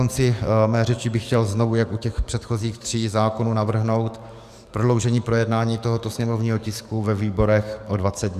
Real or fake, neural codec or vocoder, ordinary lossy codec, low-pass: fake; codec, 44.1 kHz, 7.8 kbps, DAC; Opus, 64 kbps; 14.4 kHz